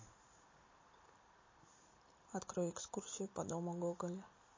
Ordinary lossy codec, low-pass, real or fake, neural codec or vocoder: MP3, 32 kbps; 7.2 kHz; real; none